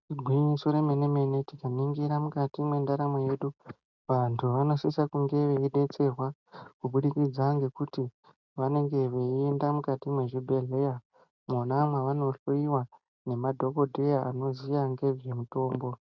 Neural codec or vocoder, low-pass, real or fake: none; 7.2 kHz; real